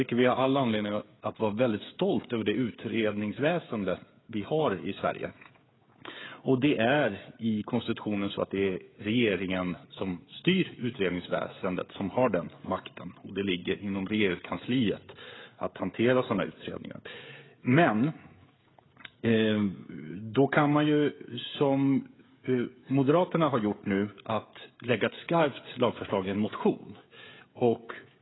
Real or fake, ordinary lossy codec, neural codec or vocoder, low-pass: fake; AAC, 16 kbps; codec, 16 kHz, 16 kbps, FreqCodec, smaller model; 7.2 kHz